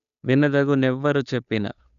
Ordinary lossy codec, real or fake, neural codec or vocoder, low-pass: none; fake; codec, 16 kHz, 2 kbps, FunCodec, trained on Chinese and English, 25 frames a second; 7.2 kHz